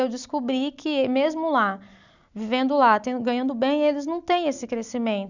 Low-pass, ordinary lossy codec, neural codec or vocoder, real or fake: 7.2 kHz; none; none; real